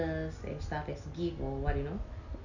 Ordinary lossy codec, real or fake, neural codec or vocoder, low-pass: none; real; none; 7.2 kHz